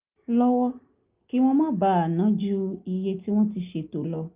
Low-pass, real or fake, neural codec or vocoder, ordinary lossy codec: 3.6 kHz; real; none; Opus, 24 kbps